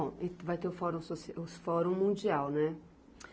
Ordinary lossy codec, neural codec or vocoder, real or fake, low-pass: none; none; real; none